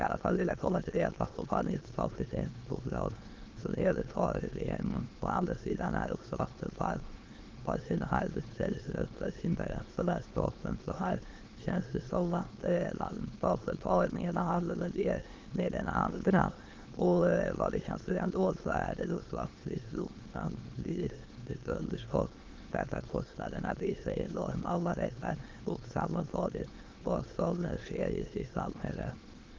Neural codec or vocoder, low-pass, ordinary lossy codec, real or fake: autoencoder, 22.05 kHz, a latent of 192 numbers a frame, VITS, trained on many speakers; 7.2 kHz; Opus, 16 kbps; fake